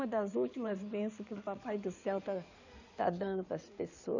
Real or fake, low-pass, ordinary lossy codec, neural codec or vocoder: fake; 7.2 kHz; none; codec, 16 kHz in and 24 kHz out, 2.2 kbps, FireRedTTS-2 codec